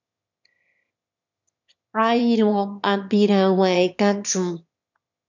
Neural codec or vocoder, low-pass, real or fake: autoencoder, 22.05 kHz, a latent of 192 numbers a frame, VITS, trained on one speaker; 7.2 kHz; fake